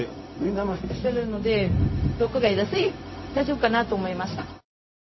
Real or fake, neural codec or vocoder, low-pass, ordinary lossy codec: fake; codec, 16 kHz, 0.4 kbps, LongCat-Audio-Codec; 7.2 kHz; MP3, 24 kbps